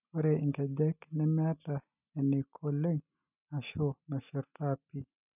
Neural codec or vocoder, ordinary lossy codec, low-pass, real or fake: none; none; 3.6 kHz; real